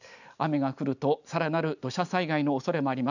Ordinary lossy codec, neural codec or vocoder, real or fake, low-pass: none; none; real; 7.2 kHz